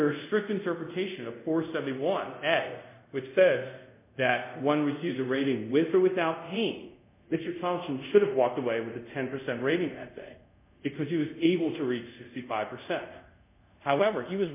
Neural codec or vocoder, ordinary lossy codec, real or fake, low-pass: codec, 24 kHz, 0.5 kbps, DualCodec; MP3, 32 kbps; fake; 3.6 kHz